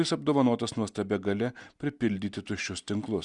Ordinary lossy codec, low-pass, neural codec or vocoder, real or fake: Opus, 64 kbps; 10.8 kHz; none; real